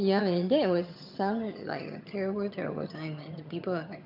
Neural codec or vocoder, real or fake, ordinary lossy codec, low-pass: vocoder, 22.05 kHz, 80 mel bands, HiFi-GAN; fake; none; 5.4 kHz